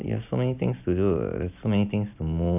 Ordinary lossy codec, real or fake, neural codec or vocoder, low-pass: none; real; none; 3.6 kHz